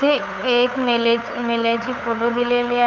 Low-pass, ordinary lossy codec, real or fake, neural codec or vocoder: 7.2 kHz; none; fake; codec, 16 kHz, 8 kbps, FunCodec, trained on LibriTTS, 25 frames a second